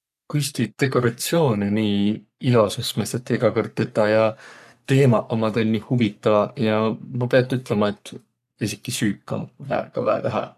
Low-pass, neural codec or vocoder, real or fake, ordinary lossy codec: 14.4 kHz; codec, 44.1 kHz, 3.4 kbps, Pupu-Codec; fake; none